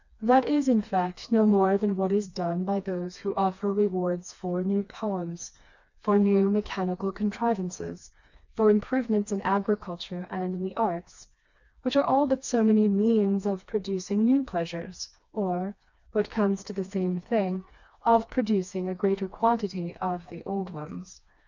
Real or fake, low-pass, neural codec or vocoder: fake; 7.2 kHz; codec, 16 kHz, 2 kbps, FreqCodec, smaller model